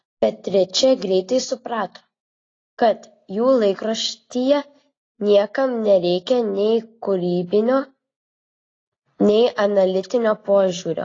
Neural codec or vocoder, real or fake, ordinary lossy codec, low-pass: none; real; AAC, 32 kbps; 7.2 kHz